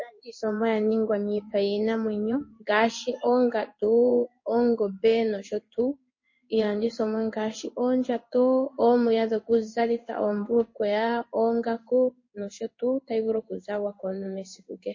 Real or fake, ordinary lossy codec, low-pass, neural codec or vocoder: fake; MP3, 32 kbps; 7.2 kHz; codec, 16 kHz in and 24 kHz out, 1 kbps, XY-Tokenizer